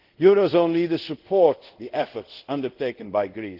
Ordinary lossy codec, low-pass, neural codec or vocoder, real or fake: Opus, 16 kbps; 5.4 kHz; codec, 24 kHz, 0.5 kbps, DualCodec; fake